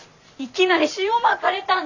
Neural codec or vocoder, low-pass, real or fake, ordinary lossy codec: vocoder, 44.1 kHz, 128 mel bands every 512 samples, BigVGAN v2; 7.2 kHz; fake; none